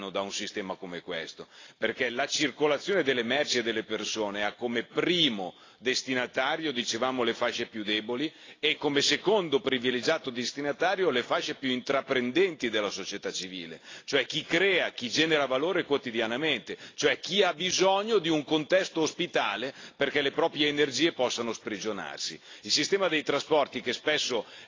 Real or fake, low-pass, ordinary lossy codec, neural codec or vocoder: real; 7.2 kHz; AAC, 32 kbps; none